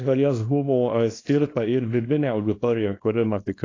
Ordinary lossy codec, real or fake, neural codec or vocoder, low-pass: AAC, 32 kbps; fake; codec, 24 kHz, 0.9 kbps, WavTokenizer, small release; 7.2 kHz